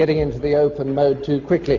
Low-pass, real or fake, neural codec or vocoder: 7.2 kHz; real; none